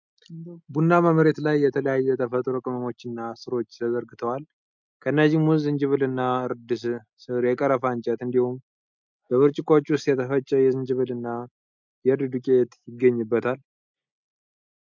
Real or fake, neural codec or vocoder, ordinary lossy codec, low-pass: real; none; MP3, 64 kbps; 7.2 kHz